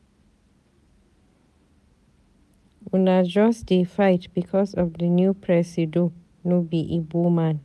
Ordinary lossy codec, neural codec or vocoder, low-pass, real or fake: none; none; none; real